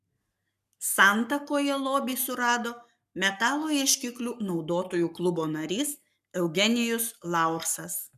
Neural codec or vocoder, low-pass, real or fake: codec, 44.1 kHz, 7.8 kbps, Pupu-Codec; 14.4 kHz; fake